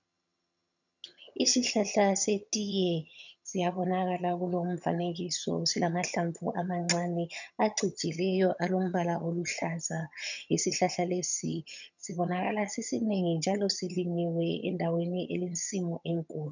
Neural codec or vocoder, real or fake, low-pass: vocoder, 22.05 kHz, 80 mel bands, HiFi-GAN; fake; 7.2 kHz